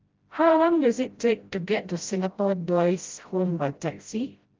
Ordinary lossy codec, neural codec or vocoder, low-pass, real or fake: Opus, 32 kbps; codec, 16 kHz, 0.5 kbps, FreqCodec, smaller model; 7.2 kHz; fake